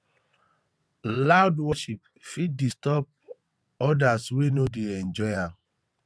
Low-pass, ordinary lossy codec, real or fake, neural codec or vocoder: none; none; fake; vocoder, 22.05 kHz, 80 mel bands, WaveNeXt